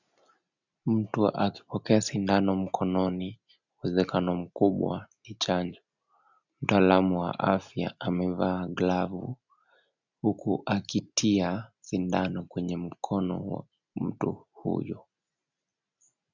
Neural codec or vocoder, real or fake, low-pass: none; real; 7.2 kHz